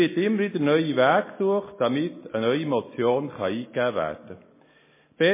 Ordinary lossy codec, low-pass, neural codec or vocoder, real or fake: MP3, 16 kbps; 3.6 kHz; none; real